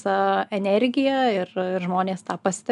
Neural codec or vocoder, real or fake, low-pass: none; real; 10.8 kHz